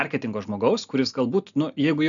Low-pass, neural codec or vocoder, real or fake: 7.2 kHz; none; real